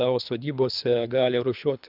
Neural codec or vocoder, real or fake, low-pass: codec, 24 kHz, 3 kbps, HILCodec; fake; 5.4 kHz